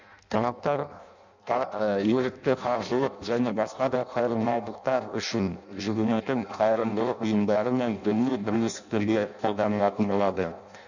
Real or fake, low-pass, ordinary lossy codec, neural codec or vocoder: fake; 7.2 kHz; none; codec, 16 kHz in and 24 kHz out, 0.6 kbps, FireRedTTS-2 codec